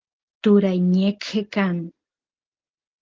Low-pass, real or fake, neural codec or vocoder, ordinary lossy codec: 7.2 kHz; real; none; Opus, 16 kbps